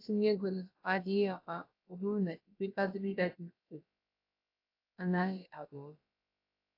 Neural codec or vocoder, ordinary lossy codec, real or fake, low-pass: codec, 16 kHz, about 1 kbps, DyCAST, with the encoder's durations; AAC, 32 kbps; fake; 5.4 kHz